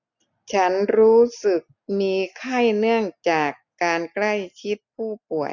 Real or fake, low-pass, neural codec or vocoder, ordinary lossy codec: real; 7.2 kHz; none; none